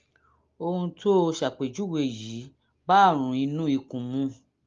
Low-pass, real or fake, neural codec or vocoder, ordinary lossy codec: 7.2 kHz; real; none; Opus, 32 kbps